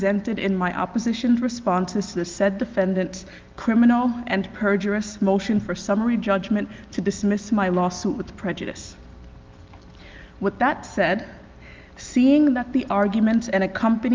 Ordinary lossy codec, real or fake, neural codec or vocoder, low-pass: Opus, 32 kbps; real; none; 7.2 kHz